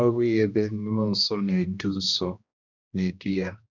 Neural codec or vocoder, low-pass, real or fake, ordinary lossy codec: codec, 16 kHz, 1 kbps, X-Codec, HuBERT features, trained on general audio; 7.2 kHz; fake; none